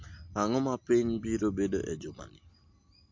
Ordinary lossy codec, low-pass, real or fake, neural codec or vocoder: MP3, 48 kbps; 7.2 kHz; real; none